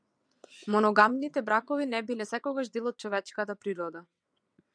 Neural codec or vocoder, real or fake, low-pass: vocoder, 22.05 kHz, 80 mel bands, WaveNeXt; fake; 9.9 kHz